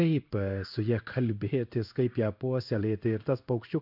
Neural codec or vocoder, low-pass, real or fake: codec, 16 kHz in and 24 kHz out, 1 kbps, XY-Tokenizer; 5.4 kHz; fake